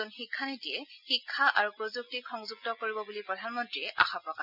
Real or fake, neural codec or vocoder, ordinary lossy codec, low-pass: real; none; none; 5.4 kHz